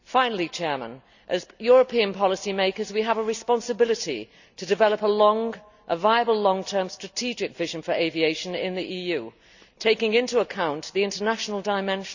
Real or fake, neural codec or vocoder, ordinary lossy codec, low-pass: real; none; none; 7.2 kHz